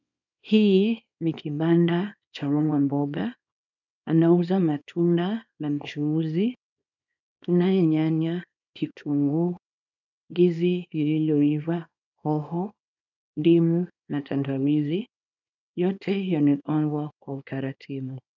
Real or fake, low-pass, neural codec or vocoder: fake; 7.2 kHz; codec, 24 kHz, 0.9 kbps, WavTokenizer, small release